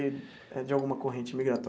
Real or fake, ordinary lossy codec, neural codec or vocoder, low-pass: real; none; none; none